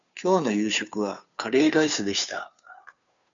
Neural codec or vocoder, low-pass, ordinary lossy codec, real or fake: codec, 16 kHz, 2 kbps, FunCodec, trained on Chinese and English, 25 frames a second; 7.2 kHz; MP3, 64 kbps; fake